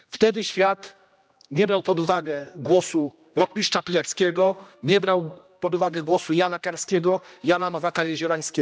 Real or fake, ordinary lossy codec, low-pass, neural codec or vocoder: fake; none; none; codec, 16 kHz, 1 kbps, X-Codec, HuBERT features, trained on general audio